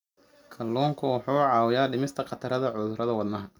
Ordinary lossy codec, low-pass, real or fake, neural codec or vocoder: none; 19.8 kHz; real; none